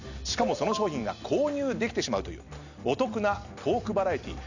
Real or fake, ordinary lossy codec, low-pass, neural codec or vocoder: real; none; 7.2 kHz; none